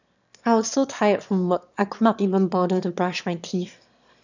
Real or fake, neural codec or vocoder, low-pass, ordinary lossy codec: fake; autoencoder, 22.05 kHz, a latent of 192 numbers a frame, VITS, trained on one speaker; 7.2 kHz; none